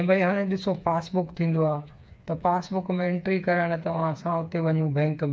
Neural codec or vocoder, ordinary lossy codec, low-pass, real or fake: codec, 16 kHz, 4 kbps, FreqCodec, smaller model; none; none; fake